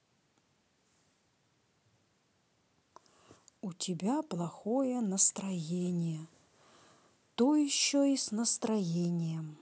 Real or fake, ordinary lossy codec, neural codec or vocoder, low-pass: real; none; none; none